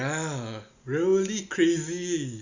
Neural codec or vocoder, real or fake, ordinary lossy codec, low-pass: none; real; Opus, 64 kbps; 7.2 kHz